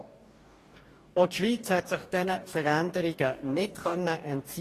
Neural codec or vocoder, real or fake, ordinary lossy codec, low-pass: codec, 44.1 kHz, 2.6 kbps, DAC; fake; AAC, 48 kbps; 14.4 kHz